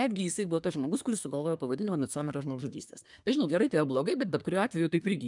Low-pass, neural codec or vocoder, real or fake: 10.8 kHz; codec, 24 kHz, 1 kbps, SNAC; fake